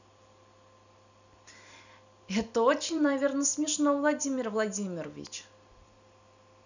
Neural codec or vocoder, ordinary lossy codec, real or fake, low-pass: vocoder, 44.1 kHz, 128 mel bands every 256 samples, BigVGAN v2; none; fake; 7.2 kHz